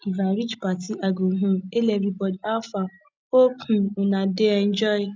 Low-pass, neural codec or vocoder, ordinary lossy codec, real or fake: none; none; none; real